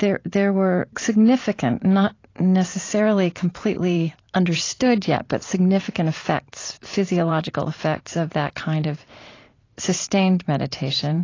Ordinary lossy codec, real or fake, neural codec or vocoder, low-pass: AAC, 32 kbps; fake; vocoder, 44.1 kHz, 128 mel bands every 512 samples, BigVGAN v2; 7.2 kHz